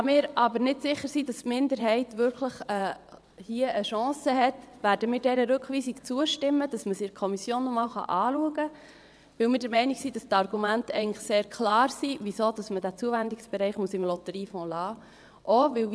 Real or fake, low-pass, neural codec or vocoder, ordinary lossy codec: fake; none; vocoder, 22.05 kHz, 80 mel bands, WaveNeXt; none